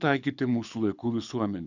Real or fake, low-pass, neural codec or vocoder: fake; 7.2 kHz; codec, 24 kHz, 6 kbps, HILCodec